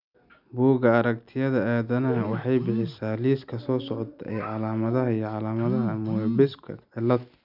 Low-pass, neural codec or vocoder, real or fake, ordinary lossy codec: 5.4 kHz; none; real; none